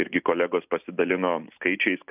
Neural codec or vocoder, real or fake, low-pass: none; real; 3.6 kHz